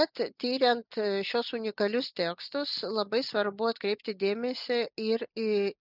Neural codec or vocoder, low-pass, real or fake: none; 5.4 kHz; real